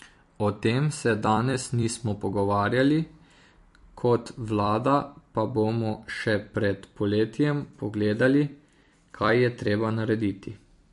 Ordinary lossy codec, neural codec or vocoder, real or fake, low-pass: MP3, 48 kbps; vocoder, 44.1 kHz, 128 mel bands every 256 samples, BigVGAN v2; fake; 14.4 kHz